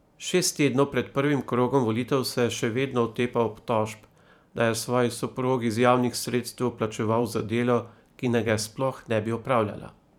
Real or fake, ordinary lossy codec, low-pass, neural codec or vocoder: fake; none; 19.8 kHz; vocoder, 44.1 kHz, 128 mel bands every 512 samples, BigVGAN v2